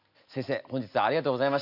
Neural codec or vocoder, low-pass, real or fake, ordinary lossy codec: none; 5.4 kHz; real; none